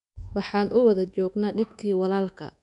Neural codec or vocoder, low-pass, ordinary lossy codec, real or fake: codec, 24 kHz, 1.2 kbps, DualCodec; 10.8 kHz; none; fake